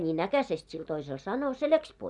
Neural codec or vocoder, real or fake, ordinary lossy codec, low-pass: none; real; none; 10.8 kHz